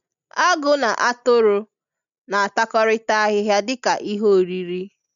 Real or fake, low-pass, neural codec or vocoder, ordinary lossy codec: real; 7.2 kHz; none; none